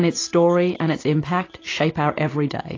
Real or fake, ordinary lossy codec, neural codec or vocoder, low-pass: real; AAC, 32 kbps; none; 7.2 kHz